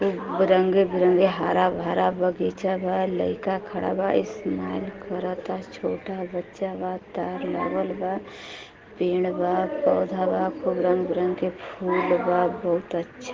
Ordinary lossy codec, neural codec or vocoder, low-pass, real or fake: Opus, 16 kbps; none; 7.2 kHz; real